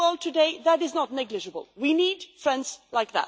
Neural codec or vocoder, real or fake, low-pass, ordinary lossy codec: none; real; none; none